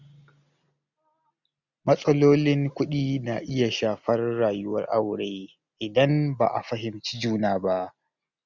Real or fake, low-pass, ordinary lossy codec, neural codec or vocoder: real; 7.2 kHz; none; none